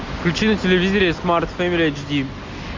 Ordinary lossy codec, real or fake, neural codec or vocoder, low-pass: MP3, 48 kbps; real; none; 7.2 kHz